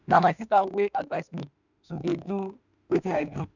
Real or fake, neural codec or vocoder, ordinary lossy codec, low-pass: fake; autoencoder, 48 kHz, 32 numbers a frame, DAC-VAE, trained on Japanese speech; none; 7.2 kHz